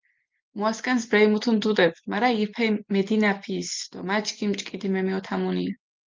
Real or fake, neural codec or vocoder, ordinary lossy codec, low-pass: real; none; Opus, 24 kbps; 7.2 kHz